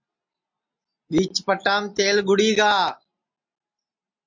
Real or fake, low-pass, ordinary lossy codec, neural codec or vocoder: real; 7.2 kHz; MP3, 48 kbps; none